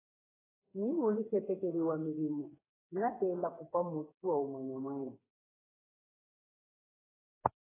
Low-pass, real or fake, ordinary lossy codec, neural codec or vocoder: 3.6 kHz; fake; AAC, 16 kbps; codec, 16 kHz, 4 kbps, FreqCodec, smaller model